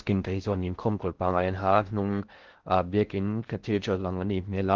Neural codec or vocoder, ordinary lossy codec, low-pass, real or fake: codec, 16 kHz in and 24 kHz out, 0.6 kbps, FocalCodec, streaming, 4096 codes; Opus, 16 kbps; 7.2 kHz; fake